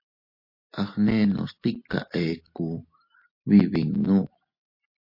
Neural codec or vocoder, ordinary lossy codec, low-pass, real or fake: none; MP3, 32 kbps; 5.4 kHz; real